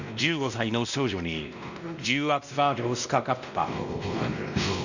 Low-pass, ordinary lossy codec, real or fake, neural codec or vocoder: 7.2 kHz; none; fake; codec, 16 kHz, 1 kbps, X-Codec, WavLM features, trained on Multilingual LibriSpeech